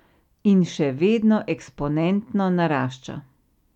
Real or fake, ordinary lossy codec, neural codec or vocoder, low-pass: real; none; none; 19.8 kHz